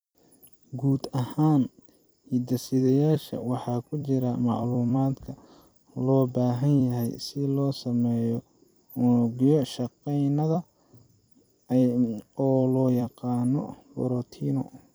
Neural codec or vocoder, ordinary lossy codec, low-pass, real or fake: vocoder, 44.1 kHz, 128 mel bands every 256 samples, BigVGAN v2; none; none; fake